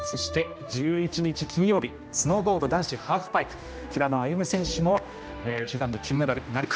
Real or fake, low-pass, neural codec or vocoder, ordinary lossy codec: fake; none; codec, 16 kHz, 1 kbps, X-Codec, HuBERT features, trained on general audio; none